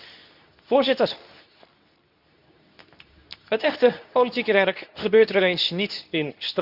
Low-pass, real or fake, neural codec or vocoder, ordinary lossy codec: 5.4 kHz; fake; codec, 24 kHz, 0.9 kbps, WavTokenizer, medium speech release version 2; none